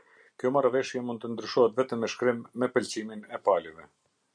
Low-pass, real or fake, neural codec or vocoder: 9.9 kHz; real; none